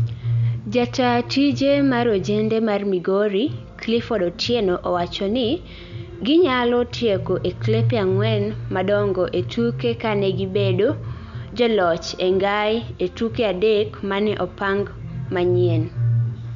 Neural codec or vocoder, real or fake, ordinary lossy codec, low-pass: none; real; none; 7.2 kHz